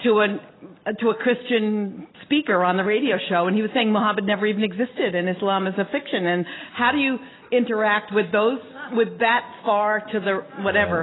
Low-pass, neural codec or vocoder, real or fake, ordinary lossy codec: 7.2 kHz; none; real; AAC, 16 kbps